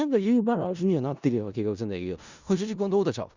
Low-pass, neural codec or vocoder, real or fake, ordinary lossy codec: 7.2 kHz; codec, 16 kHz in and 24 kHz out, 0.4 kbps, LongCat-Audio-Codec, four codebook decoder; fake; Opus, 64 kbps